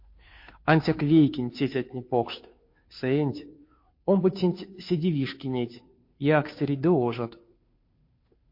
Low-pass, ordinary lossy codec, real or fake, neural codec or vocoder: 5.4 kHz; MP3, 32 kbps; fake; codec, 16 kHz, 2 kbps, FunCodec, trained on Chinese and English, 25 frames a second